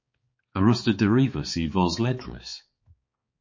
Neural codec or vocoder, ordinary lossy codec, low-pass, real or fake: codec, 16 kHz, 4 kbps, X-Codec, HuBERT features, trained on balanced general audio; MP3, 32 kbps; 7.2 kHz; fake